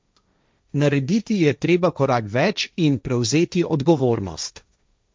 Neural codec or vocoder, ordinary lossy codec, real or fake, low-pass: codec, 16 kHz, 1.1 kbps, Voila-Tokenizer; none; fake; none